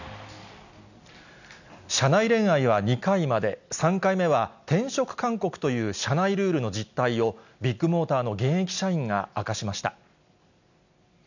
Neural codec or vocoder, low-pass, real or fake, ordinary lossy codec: none; 7.2 kHz; real; none